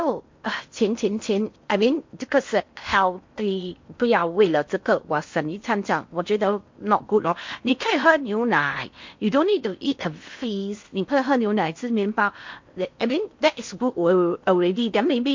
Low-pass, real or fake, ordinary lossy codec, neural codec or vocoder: 7.2 kHz; fake; MP3, 48 kbps; codec, 16 kHz in and 24 kHz out, 0.8 kbps, FocalCodec, streaming, 65536 codes